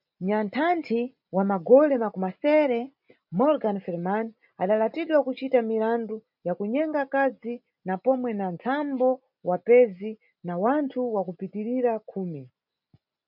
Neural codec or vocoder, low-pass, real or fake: none; 5.4 kHz; real